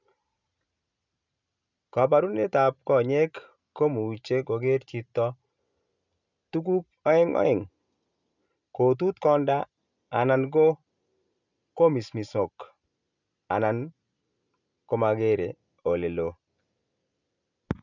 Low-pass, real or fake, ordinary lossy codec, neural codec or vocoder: 7.2 kHz; real; none; none